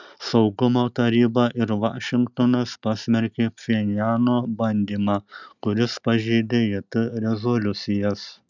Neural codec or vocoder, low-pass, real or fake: none; 7.2 kHz; real